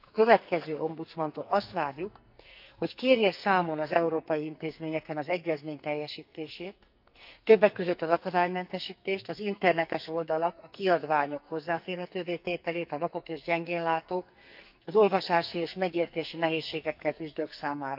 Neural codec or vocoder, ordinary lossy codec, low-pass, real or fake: codec, 44.1 kHz, 2.6 kbps, SNAC; none; 5.4 kHz; fake